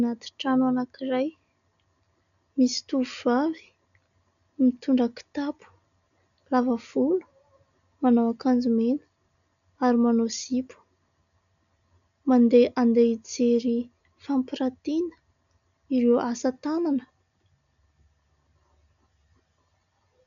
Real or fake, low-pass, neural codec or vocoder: real; 7.2 kHz; none